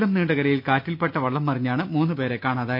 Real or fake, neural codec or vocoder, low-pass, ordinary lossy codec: real; none; 5.4 kHz; none